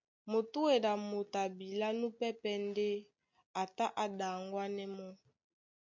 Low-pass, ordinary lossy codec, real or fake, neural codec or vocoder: 7.2 kHz; MP3, 64 kbps; real; none